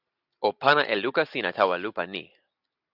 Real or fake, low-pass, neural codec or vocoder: real; 5.4 kHz; none